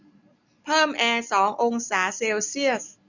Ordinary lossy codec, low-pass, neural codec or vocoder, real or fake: none; 7.2 kHz; none; real